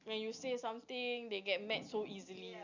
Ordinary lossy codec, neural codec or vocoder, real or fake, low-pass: none; none; real; 7.2 kHz